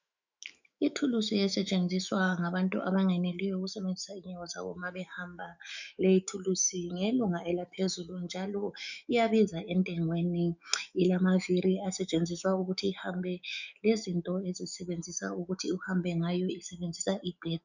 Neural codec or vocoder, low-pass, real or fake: autoencoder, 48 kHz, 128 numbers a frame, DAC-VAE, trained on Japanese speech; 7.2 kHz; fake